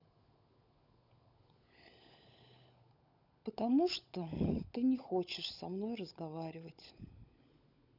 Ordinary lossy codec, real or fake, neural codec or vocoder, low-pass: none; fake; codec, 16 kHz, 16 kbps, FunCodec, trained on LibriTTS, 50 frames a second; 5.4 kHz